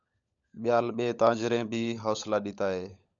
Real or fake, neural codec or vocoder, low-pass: fake; codec, 16 kHz, 16 kbps, FunCodec, trained on LibriTTS, 50 frames a second; 7.2 kHz